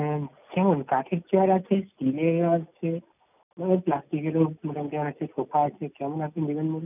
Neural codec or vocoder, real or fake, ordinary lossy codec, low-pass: none; real; none; 3.6 kHz